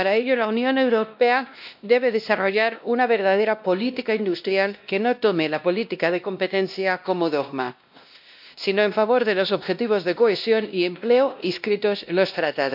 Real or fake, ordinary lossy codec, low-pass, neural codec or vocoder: fake; MP3, 48 kbps; 5.4 kHz; codec, 16 kHz, 1 kbps, X-Codec, WavLM features, trained on Multilingual LibriSpeech